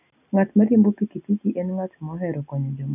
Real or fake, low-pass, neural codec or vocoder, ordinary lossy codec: real; 3.6 kHz; none; none